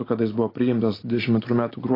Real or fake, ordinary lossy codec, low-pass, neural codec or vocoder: real; AAC, 24 kbps; 5.4 kHz; none